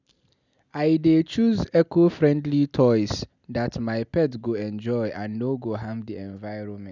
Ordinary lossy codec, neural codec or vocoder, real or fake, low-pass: none; none; real; 7.2 kHz